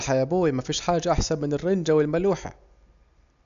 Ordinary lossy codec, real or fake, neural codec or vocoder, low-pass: none; real; none; 7.2 kHz